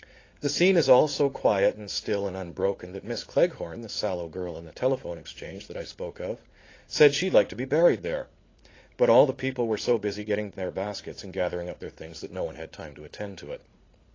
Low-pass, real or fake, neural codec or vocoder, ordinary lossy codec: 7.2 kHz; fake; vocoder, 44.1 kHz, 80 mel bands, Vocos; AAC, 32 kbps